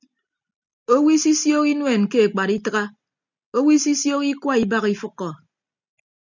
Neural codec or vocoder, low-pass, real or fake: none; 7.2 kHz; real